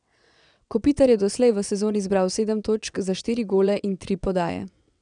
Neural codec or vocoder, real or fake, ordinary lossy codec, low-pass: vocoder, 22.05 kHz, 80 mel bands, WaveNeXt; fake; none; 9.9 kHz